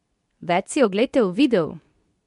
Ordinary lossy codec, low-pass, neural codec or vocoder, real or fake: none; 10.8 kHz; codec, 24 kHz, 0.9 kbps, WavTokenizer, medium speech release version 2; fake